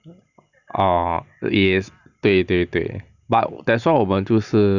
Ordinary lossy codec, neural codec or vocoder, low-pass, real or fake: none; none; 7.2 kHz; real